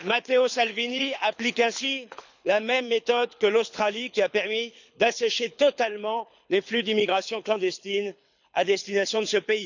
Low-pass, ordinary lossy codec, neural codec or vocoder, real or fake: 7.2 kHz; none; codec, 24 kHz, 6 kbps, HILCodec; fake